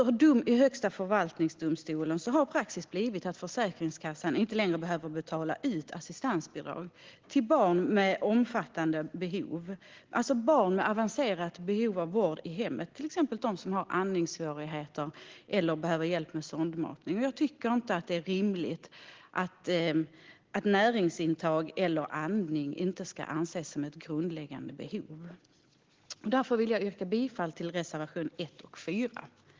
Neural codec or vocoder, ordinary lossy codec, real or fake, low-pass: none; Opus, 16 kbps; real; 7.2 kHz